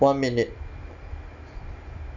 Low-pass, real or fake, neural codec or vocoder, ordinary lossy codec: 7.2 kHz; real; none; none